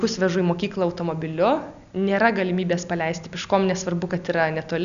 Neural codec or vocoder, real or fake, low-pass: none; real; 7.2 kHz